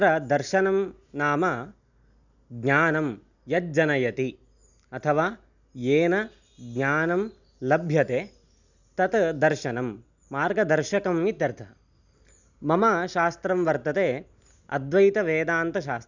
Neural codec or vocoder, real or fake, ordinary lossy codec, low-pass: none; real; none; 7.2 kHz